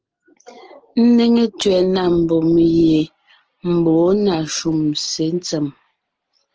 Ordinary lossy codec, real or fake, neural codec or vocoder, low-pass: Opus, 16 kbps; real; none; 7.2 kHz